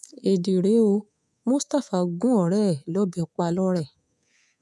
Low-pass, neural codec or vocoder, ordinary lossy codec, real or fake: none; codec, 24 kHz, 3.1 kbps, DualCodec; none; fake